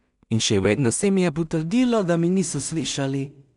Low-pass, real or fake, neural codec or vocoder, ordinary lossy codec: 10.8 kHz; fake; codec, 16 kHz in and 24 kHz out, 0.4 kbps, LongCat-Audio-Codec, two codebook decoder; none